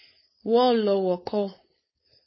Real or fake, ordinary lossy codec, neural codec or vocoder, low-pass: fake; MP3, 24 kbps; codec, 16 kHz, 4.8 kbps, FACodec; 7.2 kHz